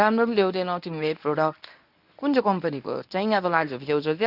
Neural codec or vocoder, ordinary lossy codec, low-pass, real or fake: codec, 24 kHz, 0.9 kbps, WavTokenizer, medium speech release version 1; none; 5.4 kHz; fake